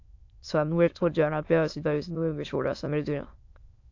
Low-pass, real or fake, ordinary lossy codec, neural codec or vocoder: 7.2 kHz; fake; AAC, 48 kbps; autoencoder, 22.05 kHz, a latent of 192 numbers a frame, VITS, trained on many speakers